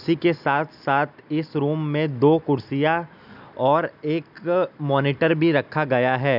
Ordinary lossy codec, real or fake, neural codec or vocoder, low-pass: none; real; none; 5.4 kHz